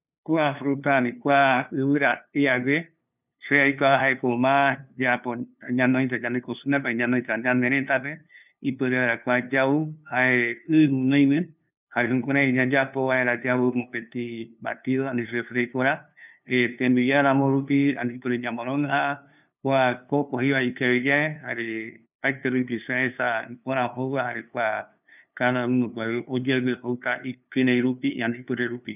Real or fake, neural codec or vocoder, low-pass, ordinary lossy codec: fake; codec, 16 kHz, 2 kbps, FunCodec, trained on LibriTTS, 25 frames a second; 3.6 kHz; none